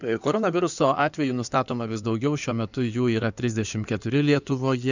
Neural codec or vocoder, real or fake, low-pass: codec, 16 kHz in and 24 kHz out, 2.2 kbps, FireRedTTS-2 codec; fake; 7.2 kHz